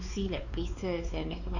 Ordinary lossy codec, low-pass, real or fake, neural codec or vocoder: none; 7.2 kHz; fake; codec, 16 kHz, 4 kbps, X-Codec, WavLM features, trained on Multilingual LibriSpeech